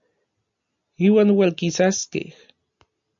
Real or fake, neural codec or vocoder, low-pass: real; none; 7.2 kHz